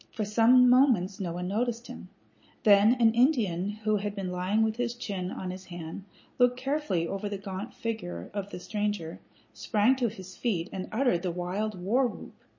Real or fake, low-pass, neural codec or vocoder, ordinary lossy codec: real; 7.2 kHz; none; MP3, 32 kbps